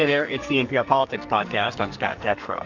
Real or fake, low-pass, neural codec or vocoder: fake; 7.2 kHz; codec, 44.1 kHz, 2.6 kbps, SNAC